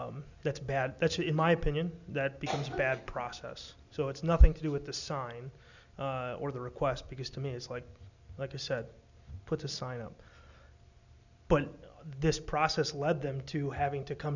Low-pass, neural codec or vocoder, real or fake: 7.2 kHz; none; real